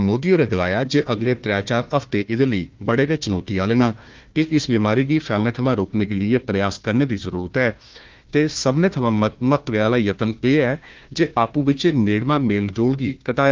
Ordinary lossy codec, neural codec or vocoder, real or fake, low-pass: Opus, 32 kbps; codec, 16 kHz, 1 kbps, FunCodec, trained on Chinese and English, 50 frames a second; fake; 7.2 kHz